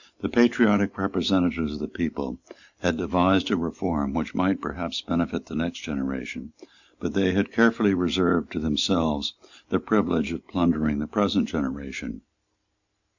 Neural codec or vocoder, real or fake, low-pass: none; real; 7.2 kHz